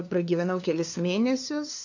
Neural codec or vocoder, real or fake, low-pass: codec, 16 kHz, 2 kbps, FunCodec, trained on LibriTTS, 25 frames a second; fake; 7.2 kHz